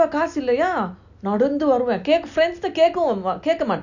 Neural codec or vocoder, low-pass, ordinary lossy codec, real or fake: none; 7.2 kHz; none; real